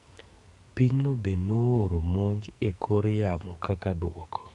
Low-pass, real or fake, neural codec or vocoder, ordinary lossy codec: 10.8 kHz; fake; codec, 44.1 kHz, 2.6 kbps, SNAC; none